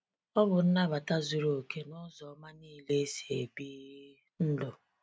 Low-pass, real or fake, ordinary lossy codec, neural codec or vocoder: none; real; none; none